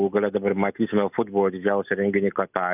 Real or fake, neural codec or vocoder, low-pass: real; none; 3.6 kHz